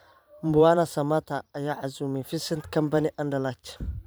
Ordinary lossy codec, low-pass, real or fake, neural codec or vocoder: none; none; fake; vocoder, 44.1 kHz, 128 mel bands every 512 samples, BigVGAN v2